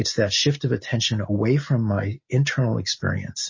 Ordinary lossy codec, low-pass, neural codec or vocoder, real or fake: MP3, 32 kbps; 7.2 kHz; none; real